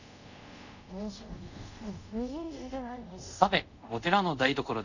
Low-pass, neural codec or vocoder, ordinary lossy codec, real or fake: 7.2 kHz; codec, 24 kHz, 0.5 kbps, DualCodec; none; fake